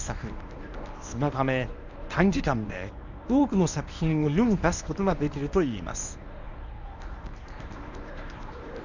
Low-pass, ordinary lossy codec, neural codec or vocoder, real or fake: 7.2 kHz; none; codec, 24 kHz, 0.9 kbps, WavTokenizer, medium speech release version 1; fake